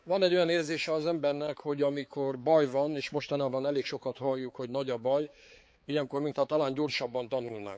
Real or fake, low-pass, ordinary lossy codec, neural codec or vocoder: fake; none; none; codec, 16 kHz, 4 kbps, X-Codec, HuBERT features, trained on balanced general audio